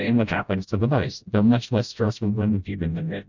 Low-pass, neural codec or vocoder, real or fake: 7.2 kHz; codec, 16 kHz, 0.5 kbps, FreqCodec, smaller model; fake